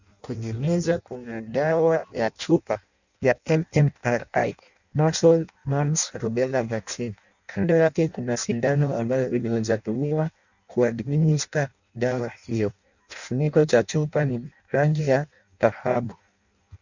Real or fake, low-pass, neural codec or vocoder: fake; 7.2 kHz; codec, 16 kHz in and 24 kHz out, 0.6 kbps, FireRedTTS-2 codec